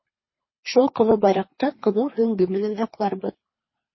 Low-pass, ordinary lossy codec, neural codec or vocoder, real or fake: 7.2 kHz; MP3, 24 kbps; codec, 24 kHz, 3 kbps, HILCodec; fake